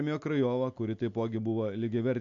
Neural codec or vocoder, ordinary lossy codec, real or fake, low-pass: none; AAC, 64 kbps; real; 7.2 kHz